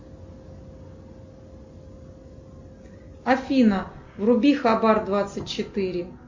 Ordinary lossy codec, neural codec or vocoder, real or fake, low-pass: MP3, 48 kbps; none; real; 7.2 kHz